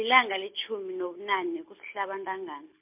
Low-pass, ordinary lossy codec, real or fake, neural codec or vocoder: 3.6 kHz; MP3, 32 kbps; real; none